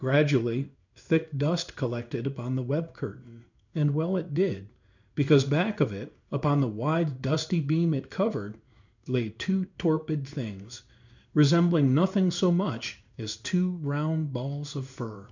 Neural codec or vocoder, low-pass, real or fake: codec, 16 kHz in and 24 kHz out, 1 kbps, XY-Tokenizer; 7.2 kHz; fake